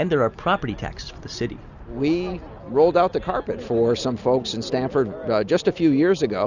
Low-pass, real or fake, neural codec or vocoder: 7.2 kHz; real; none